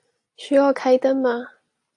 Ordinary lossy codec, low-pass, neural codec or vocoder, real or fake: AAC, 48 kbps; 10.8 kHz; none; real